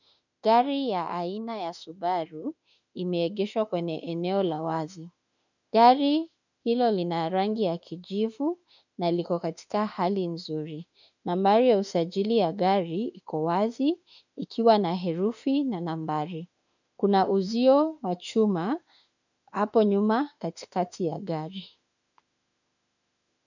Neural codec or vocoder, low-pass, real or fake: autoencoder, 48 kHz, 32 numbers a frame, DAC-VAE, trained on Japanese speech; 7.2 kHz; fake